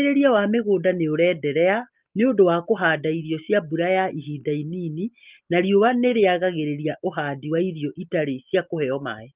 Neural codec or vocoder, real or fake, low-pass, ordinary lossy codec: none; real; 3.6 kHz; Opus, 32 kbps